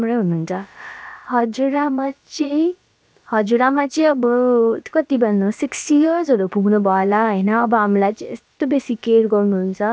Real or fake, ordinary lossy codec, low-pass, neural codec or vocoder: fake; none; none; codec, 16 kHz, about 1 kbps, DyCAST, with the encoder's durations